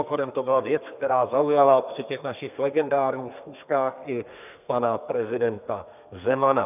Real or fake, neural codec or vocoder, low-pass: fake; codec, 32 kHz, 1.9 kbps, SNAC; 3.6 kHz